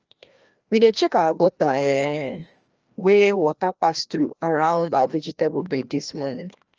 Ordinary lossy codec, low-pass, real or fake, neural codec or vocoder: Opus, 24 kbps; 7.2 kHz; fake; codec, 16 kHz, 1 kbps, FreqCodec, larger model